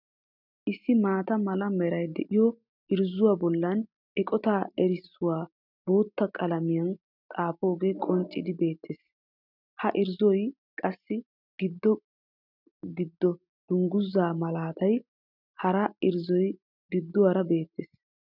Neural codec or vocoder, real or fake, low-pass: none; real; 5.4 kHz